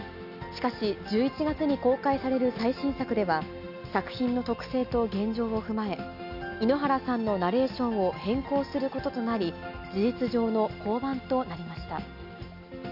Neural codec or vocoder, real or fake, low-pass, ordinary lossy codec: none; real; 5.4 kHz; none